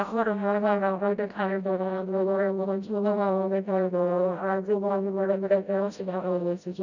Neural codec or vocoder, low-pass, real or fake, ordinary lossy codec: codec, 16 kHz, 0.5 kbps, FreqCodec, smaller model; 7.2 kHz; fake; none